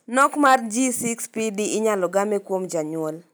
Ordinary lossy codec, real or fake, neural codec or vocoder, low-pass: none; real; none; none